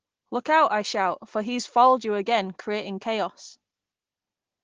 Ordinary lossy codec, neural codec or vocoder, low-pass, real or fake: Opus, 16 kbps; none; 7.2 kHz; real